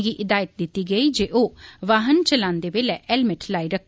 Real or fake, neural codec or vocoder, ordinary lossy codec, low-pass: real; none; none; none